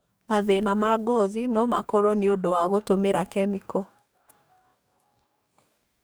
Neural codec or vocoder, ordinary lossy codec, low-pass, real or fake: codec, 44.1 kHz, 2.6 kbps, DAC; none; none; fake